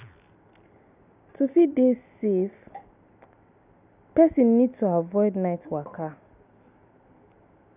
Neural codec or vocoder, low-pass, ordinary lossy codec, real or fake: none; 3.6 kHz; none; real